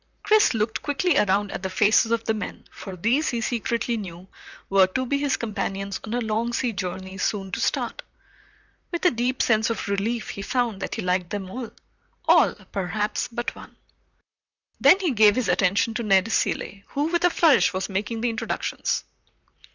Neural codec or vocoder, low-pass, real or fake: vocoder, 44.1 kHz, 128 mel bands, Pupu-Vocoder; 7.2 kHz; fake